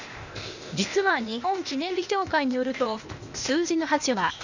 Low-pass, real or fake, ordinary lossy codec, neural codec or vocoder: 7.2 kHz; fake; none; codec, 16 kHz, 0.8 kbps, ZipCodec